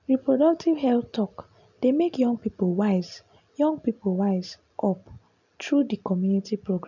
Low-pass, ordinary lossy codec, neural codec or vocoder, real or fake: 7.2 kHz; none; none; real